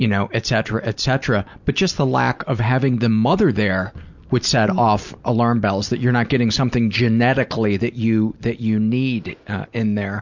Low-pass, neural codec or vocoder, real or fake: 7.2 kHz; none; real